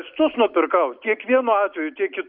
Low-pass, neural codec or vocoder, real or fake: 5.4 kHz; none; real